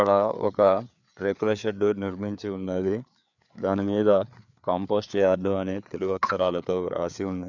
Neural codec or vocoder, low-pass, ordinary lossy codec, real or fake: codec, 16 kHz, 4 kbps, FreqCodec, larger model; 7.2 kHz; none; fake